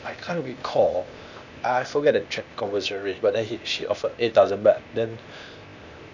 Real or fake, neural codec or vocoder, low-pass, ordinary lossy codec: fake; codec, 16 kHz, 0.8 kbps, ZipCodec; 7.2 kHz; none